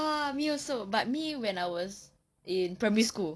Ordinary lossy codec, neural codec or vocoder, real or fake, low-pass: none; none; real; none